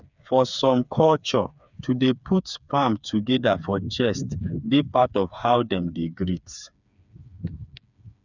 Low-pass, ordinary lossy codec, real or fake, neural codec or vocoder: 7.2 kHz; none; fake; codec, 16 kHz, 4 kbps, FreqCodec, smaller model